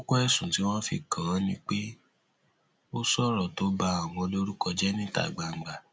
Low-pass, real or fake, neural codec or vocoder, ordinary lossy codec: none; real; none; none